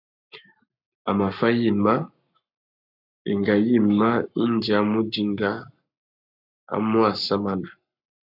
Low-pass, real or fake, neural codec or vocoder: 5.4 kHz; fake; codec, 44.1 kHz, 7.8 kbps, Pupu-Codec